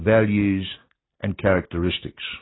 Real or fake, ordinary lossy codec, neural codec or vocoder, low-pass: real; AAC, 16 kbps; none; 7.2 kHz